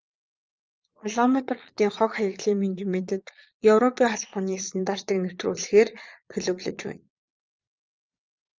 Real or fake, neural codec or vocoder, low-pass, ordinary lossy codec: fake; vocoder, 22.05 kHz, 80 mel bands, Vocos; 7.2 kHz; Opus, 32 kbps